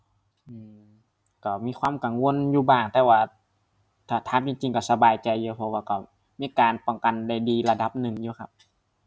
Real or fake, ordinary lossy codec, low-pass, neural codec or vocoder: real; none; none; none